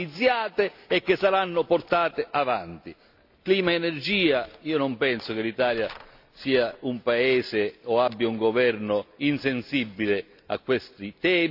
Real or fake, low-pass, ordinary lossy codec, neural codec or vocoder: real; 5.4 kHz; AAC, 48 kbps; none